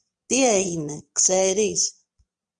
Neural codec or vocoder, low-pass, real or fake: vocoder, 22.05 kHz, 80 mel bands, Vocos; 9.9 kHz; fake